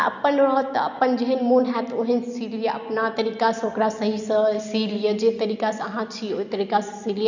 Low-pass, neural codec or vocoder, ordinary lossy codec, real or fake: 7.2 kHz; none; none; real